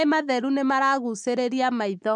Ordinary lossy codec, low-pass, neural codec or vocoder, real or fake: MP3, 96 kbps; 10.8 kHz; none; real